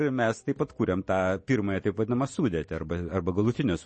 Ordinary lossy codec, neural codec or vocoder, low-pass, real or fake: MP3, 32 kbps; none; 9.9 kHz; real